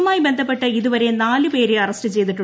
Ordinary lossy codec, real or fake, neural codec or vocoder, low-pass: none; real; none; none